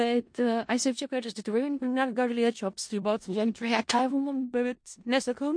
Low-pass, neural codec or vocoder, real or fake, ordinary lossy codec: 9.9 kHz; codec, 16 kHz in and 24 kHz out, 0.4 kbps, LongCat-Audio-Codec, four codebook decoder; fake; MP3, 48 kbps